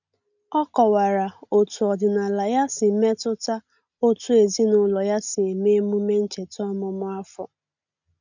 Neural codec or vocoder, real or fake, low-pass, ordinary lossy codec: none; real; 7.2 kHz; none